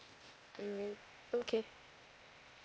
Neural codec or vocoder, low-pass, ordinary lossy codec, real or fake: codec, 16 kHz, 0.8 kbps, ZipCodec; none; none; fake